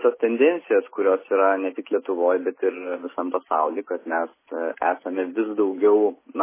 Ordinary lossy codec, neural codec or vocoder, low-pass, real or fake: MP3, 16 kbps; none; 3.6 kHz; real